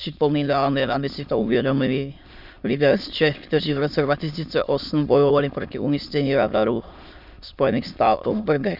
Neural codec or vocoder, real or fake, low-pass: autoencoder, 22.05 kHz, a latent of 192 numbers a frame, VITS, trained on many speakers; fake; 5.4 kHz